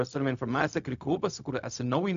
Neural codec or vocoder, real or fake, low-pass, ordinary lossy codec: codec, 16 kHz, 0.4 kbps, LongCat-Audio-Codec; fake; 7.2 kHz; MP3, 64 kbps